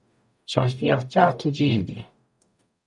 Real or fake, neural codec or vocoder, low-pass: fake; codec, 44.1 kHz, 0.9 kbps, DAC; 10.8 kHz